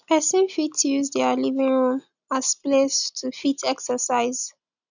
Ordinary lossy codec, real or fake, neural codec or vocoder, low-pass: none; real; none; 7.2 kHz